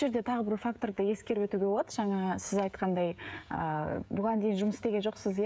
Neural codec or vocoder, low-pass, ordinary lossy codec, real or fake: codec, 16 kHz, 16 kbps, FreqCodec, smaller model; none; none; fake